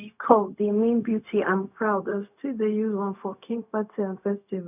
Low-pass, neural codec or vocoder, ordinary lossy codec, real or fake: 3.6 kHz; codec, 16 kHz, 0.4 kbps, LongCat-Audio-Codec; none; fake